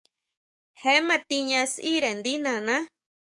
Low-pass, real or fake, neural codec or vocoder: 10.8 kHz; fake; codec, 44.1 kHz, 7.8 kbps, DAC